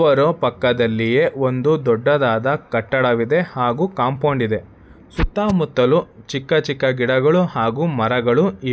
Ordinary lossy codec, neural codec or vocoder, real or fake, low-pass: none; none; real; none